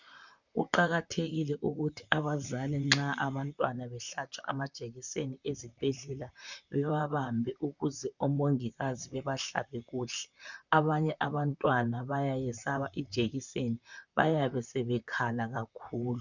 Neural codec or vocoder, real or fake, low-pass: vocoder, 44.1 kHz, 128 mel bands, Pupu-Vocoder; fake; 7.2 kHz